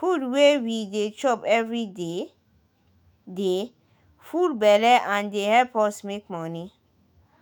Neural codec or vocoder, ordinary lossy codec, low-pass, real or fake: autoencoder, 48 kHz, 128 numbers a frame, DAC-VAE, trained on Japanese speech; none; none; fake